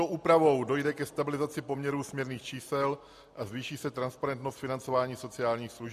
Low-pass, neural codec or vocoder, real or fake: 14.4 kHz; none; real